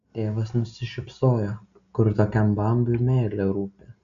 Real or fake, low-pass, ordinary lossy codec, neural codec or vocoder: real; 7.2 kHz; AAC, 96 kbps; none